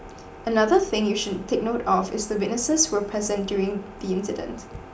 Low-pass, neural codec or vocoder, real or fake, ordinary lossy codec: none; none; real; none